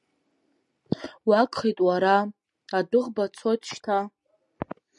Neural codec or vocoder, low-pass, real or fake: none; 10.8 kHz; real